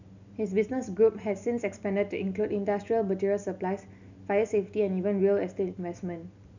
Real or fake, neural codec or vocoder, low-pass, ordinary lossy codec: real; none; 7.2 kHz; none